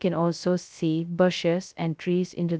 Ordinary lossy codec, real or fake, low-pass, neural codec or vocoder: none; fake; none; codec, 16 kHz, 0.2 kbps, FocalCodec